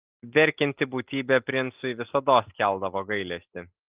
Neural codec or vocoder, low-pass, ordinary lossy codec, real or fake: none; 3.6 kHz; Opus, 32 kbps; real